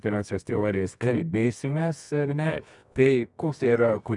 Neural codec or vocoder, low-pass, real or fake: codec, 24 kHz, 0.9 kbps, WavTokenizer, medium music audio release; 10.8 kHz; fake